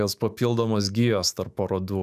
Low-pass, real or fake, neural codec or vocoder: 14.4 kHz; fake; autoencoder, 48 kHz, 128 numbers a frame, DAC-VAE, trained on Japanese speech